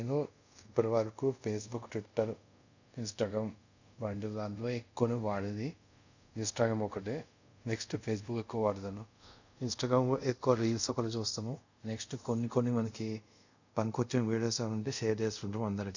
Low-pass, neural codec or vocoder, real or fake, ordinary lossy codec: 7.2 kHz; codec, 24 kHz, 0.5 kbps, DualCodec; fake; none